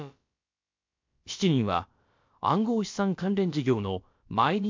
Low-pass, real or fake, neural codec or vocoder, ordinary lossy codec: 7.2 kHz; fake; codec, 16 kHz, about 1 kbps, DyCAST, with the encoder's durations; MP3, 48 kbps